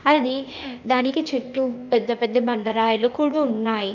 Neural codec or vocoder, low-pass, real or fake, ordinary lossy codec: codec, 16 kHz, 0.8 kbps, ZipCodec; 7.2 kHz; fake; none